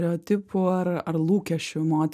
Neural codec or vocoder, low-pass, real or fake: none; 14.4 kHz; real